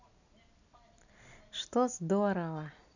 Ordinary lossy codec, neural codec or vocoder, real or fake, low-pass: none; none; real; 7.2 kHz